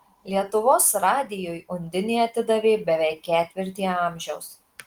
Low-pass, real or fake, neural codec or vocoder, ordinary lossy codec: 19.8 kHz; real; none; Opus, 24 kbps